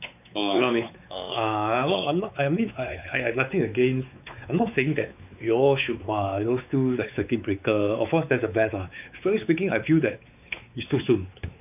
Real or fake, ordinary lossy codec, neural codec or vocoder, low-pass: fake; none; codec, 16 kHz, 4 kbps, X-Codec, WavLM features, trained on Multilingual LibriSpeech; 3.6 kHz